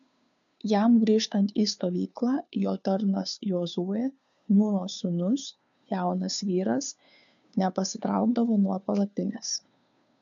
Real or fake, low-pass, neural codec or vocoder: fake; 7.2 kHz; codec, 16 kHz, 2 kbps, FunCodec, trained on Chinese and English, 25 frames a second